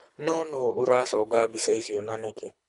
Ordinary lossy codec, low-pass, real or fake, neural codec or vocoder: none; 10.8 kHz; fake; codec, 24 kHz, 3 kbps, HILCodec